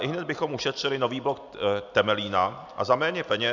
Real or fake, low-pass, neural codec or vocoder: real; 7.2 kHz; none